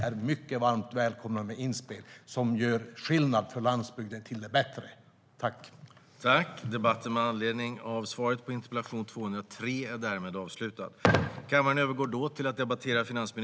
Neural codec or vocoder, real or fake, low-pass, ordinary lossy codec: none; real; none; none